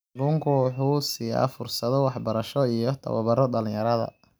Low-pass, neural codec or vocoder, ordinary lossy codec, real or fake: none; none; none; real